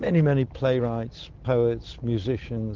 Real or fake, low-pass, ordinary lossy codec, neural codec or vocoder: real; 7.2 kHz; Opus, 16 kbps; none